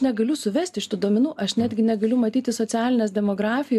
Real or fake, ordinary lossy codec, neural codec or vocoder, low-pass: real; MP3, 96 kbps; none; 14.4 kHz